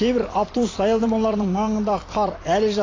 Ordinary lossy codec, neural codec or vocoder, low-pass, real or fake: AAC, 32 kbps; vocoder, 44.1 kHz, 128 mel bands every 256 samples, BigVGAN v2; 7.2 kHz; fake